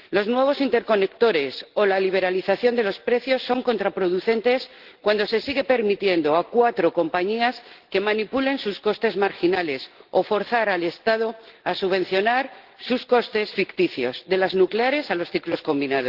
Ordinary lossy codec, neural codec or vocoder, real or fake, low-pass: Opus, 16 kbps; none; real; 5.4 kHz